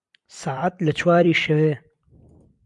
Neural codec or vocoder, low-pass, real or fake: vocoder, 44.1 kHz, 128 mel bands every 256 samples, BigVGAN v2; 10.8 kHz; fake